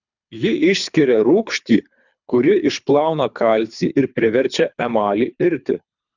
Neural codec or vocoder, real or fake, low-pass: codec, 24 kHz, 3 kbps, HILCodec; fake; 7.2 kHz